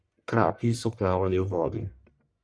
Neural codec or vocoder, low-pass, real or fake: codec, 44.1 kHz, 1.7 kbps, Pupu-Codec; 9.9 kHz; fake